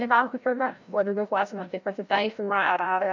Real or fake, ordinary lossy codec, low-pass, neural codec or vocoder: fake; MP3, 64 kbps; 7.2 kHz; codec, 16 kHz, 0.5 kbps, FreqCodec, larger model